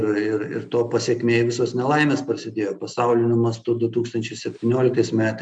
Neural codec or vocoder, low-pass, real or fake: none; 9.9 kHz; real